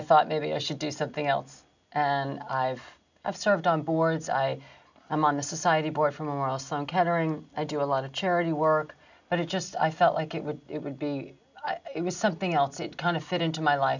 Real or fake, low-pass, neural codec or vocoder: real; 7.2 kHz; none